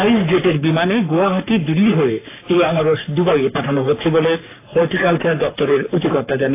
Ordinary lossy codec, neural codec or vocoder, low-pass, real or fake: AAC, 24 kbps; codec, 44.1 kHz, 3.4 kbps, Pupu-Codec; 3.6 kHz; fake